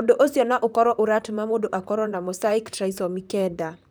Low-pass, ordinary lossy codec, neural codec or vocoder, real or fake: none; none; vocoder, 44.1 kHz, 128 mel bands, Pupu-Vocoder; fake